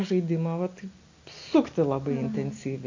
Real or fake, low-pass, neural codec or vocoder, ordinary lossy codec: real; 7.2 kHz; none; AAC, 48 kbps